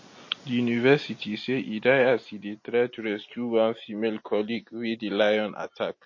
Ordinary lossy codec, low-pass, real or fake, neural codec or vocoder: MP3, 32 kbps; 7.2 kHz; real; none